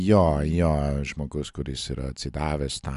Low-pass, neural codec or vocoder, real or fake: 10.8 kHz; none; real